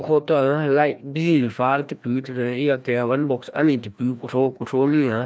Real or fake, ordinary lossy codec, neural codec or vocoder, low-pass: fake; none; codec, 16 kHz, 1 kbps, FreqCodec, larger model; none